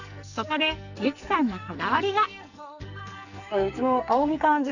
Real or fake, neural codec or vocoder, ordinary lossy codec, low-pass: fake; codec, 44.1 kHz, 2.6 kbps, SNAC; none; 7.2 kHz